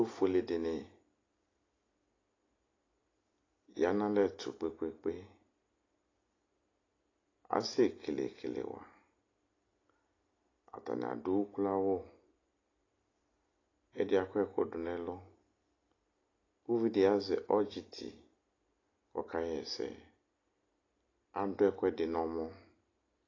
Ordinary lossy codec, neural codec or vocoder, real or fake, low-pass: AAC, 32 kbps; none; real; 7.2 kHz